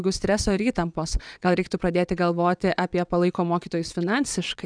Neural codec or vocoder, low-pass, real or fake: vocoder, 22.05 kHz, 80 mel bands, WaveNeXt; 9.9 kHz; fake